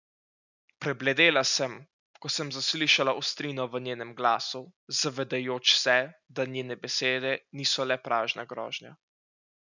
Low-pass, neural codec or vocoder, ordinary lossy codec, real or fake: 7.2 kHz; none; none; real